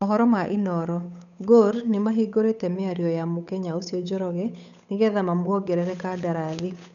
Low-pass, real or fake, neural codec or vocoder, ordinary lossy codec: 7.2 kHz; fake; codec, 16 kHz, 8 kbps, FunCodec, trained on Chinese and English, 25 frames a second; none